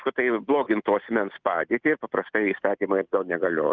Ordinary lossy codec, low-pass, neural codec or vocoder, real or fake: Opus, 24 kbps; 7.2 kHz; none; real